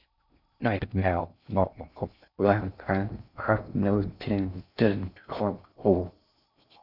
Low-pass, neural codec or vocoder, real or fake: 5.4 kHz; codec, 16 kHz in and 24 kHz out, 0.6 kbps, FocalCodec, streaming, 2048 codes; fake